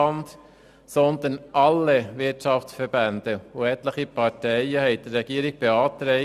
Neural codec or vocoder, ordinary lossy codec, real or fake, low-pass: none; none; real; 14.4 kHz